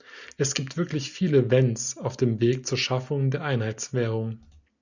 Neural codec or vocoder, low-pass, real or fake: none; 7.2 kHz; real